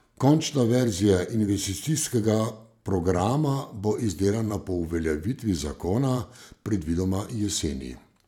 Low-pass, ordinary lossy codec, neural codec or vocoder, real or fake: 19.8 kHz; none; vocoder, 44.1 kHz, 128 mel bands every 512 samples, BigVGAN v2; fake